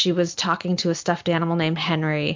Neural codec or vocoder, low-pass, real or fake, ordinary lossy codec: none; 7.2 kHz; real; MP3, 64 kbps